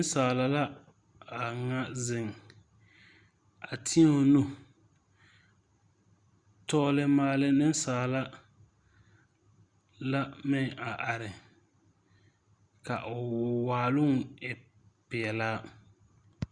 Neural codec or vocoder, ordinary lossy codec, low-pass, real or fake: none; Opus, 64 kbps; 9.9 kHz; real